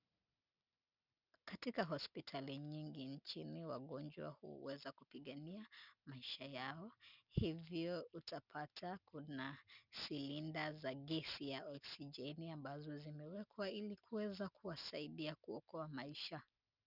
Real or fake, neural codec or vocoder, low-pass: real; none; 5.4 kHz